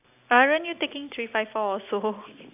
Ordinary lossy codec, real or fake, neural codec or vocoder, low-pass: none; real; none; 3.6 kHz